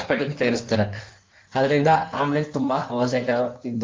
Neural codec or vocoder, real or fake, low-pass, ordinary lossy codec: codec, 16 kHz in and 24 kHz out, 1.1 kbps, FireRedTTS-2 codec; fake; 7.2 kHz; Opus, 24 kbps